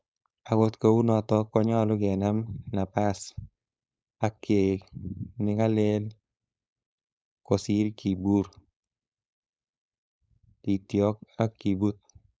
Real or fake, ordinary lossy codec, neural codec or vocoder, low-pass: fake; none; codec, 16 kHz, 4.8 kbps, FACodec; none